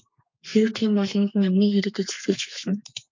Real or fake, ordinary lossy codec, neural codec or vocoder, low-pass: fake; MP3, 48 kbps; codec, 32 kHz, 1.9 kbps, SNAC; 7.2 kHz